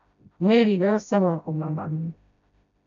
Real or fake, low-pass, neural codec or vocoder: fake; 7.2 kHz; codec, 16 kHz, 0.5 kbps, FreqCodec, smaller model